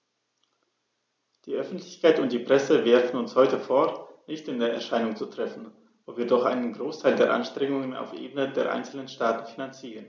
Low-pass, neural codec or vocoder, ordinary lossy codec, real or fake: none; none; none; real